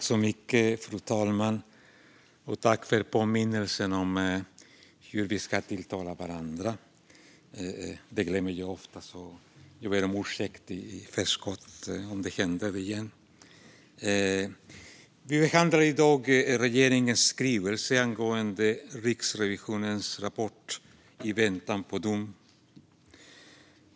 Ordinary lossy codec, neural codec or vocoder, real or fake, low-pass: none; none; real; none